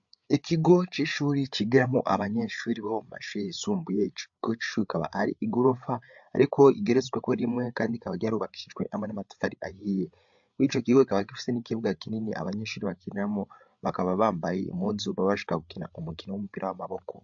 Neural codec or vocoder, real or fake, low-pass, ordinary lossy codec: codec, 16 kHz, 8 kbps, FreqCodec, larger model; fake; 7.2 kHz; AAC, 64 kbps